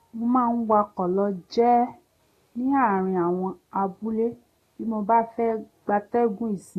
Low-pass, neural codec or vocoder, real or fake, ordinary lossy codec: 14.4 kHz; none; real; AAC, 48 kbps